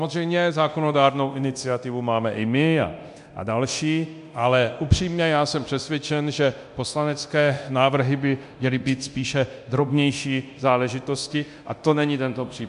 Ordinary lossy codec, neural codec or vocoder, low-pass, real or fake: MP3, 64 kbps; codec, 24 kHz, 0.9 kbps, DualCodec; 10.8 kHz; fake